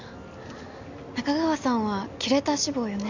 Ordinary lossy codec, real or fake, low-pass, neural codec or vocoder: none; real; 7.2 kHz; none